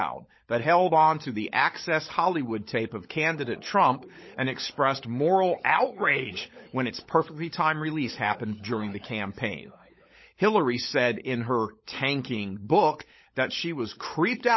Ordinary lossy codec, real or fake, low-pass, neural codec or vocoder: MP3, 24 kbps; fake; 7.2 kHz; codec, 16 kHz, 16 kbps, FunCodec, trained on LibriTTS, 50 frames a second